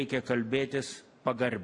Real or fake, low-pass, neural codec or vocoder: real; 10.8 kHz; none